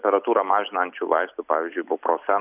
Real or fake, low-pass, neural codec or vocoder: real; 3.6 kHz; none